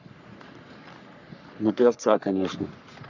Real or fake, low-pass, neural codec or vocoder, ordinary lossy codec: fake; 7.2 kHz; codec, 44.1 kHz, 3.4 kbps, Pupu-Codec; none